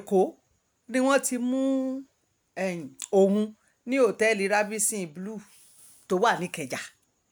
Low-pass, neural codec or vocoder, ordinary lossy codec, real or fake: none; none; none; real